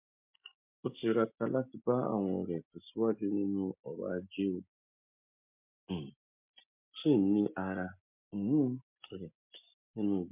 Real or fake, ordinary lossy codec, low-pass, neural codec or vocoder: real; MP3, 32 kbps; 3.6 kHz; none